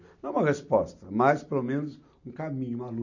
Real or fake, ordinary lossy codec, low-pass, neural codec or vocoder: real; none; 7.2 kHz; none